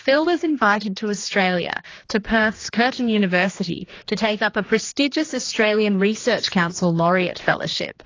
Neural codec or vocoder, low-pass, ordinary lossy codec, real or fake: codec, 16 kHz, 2 kbps, X-Codec, HuBERT features, trained on general audio; 7.2 kHz; AAC, 32 kbps; fake